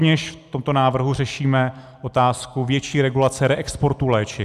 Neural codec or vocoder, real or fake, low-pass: none; real; 14.4 kHz